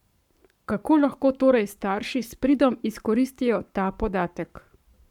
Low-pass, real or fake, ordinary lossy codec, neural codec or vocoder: 19.8 kHz; fake; none; codec, 44.1 kHz, 7.8 kbps, Pupu-Codec